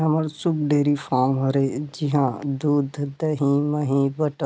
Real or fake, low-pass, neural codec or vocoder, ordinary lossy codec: real; none; none; none